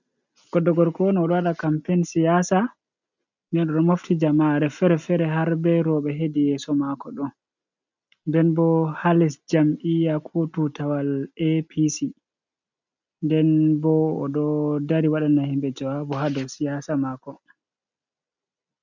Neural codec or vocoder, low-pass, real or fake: none; 7.2 kHz; real